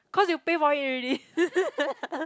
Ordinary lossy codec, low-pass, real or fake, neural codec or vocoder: none; none; real; none